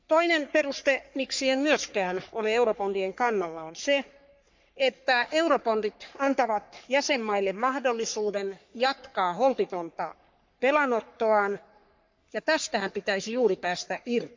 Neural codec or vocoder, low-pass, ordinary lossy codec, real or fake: codec, 44.1 kHz, 3.4 kbps, Pupu-Codec; 7.2 kHz; MP3, 64 kbps; fake